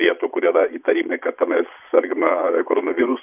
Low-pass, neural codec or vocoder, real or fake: 3.6 kHz; vocoder, 22.05 kHz, 80 mel bands, Vocos; fake